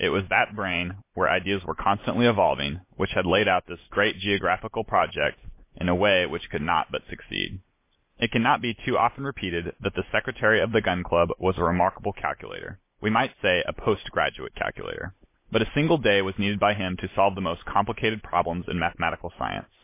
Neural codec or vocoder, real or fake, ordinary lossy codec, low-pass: none; real; MP3, 24 kbps; 3.6 kHz